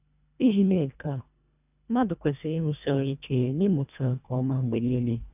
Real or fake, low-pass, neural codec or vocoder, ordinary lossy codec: fake; 3.6 kHz; codec, 24 kHz, 1.5 kbps, HILCodec; none